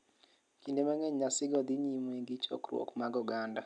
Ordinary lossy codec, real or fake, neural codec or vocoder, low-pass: none; real; none; 9.9 kHz